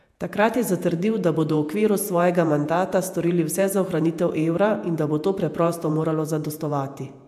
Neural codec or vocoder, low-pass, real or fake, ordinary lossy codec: vocoder, 48 kHz, 128 mel bands, Vocos; 14.4 kHz; fake; AAC, 96 kbps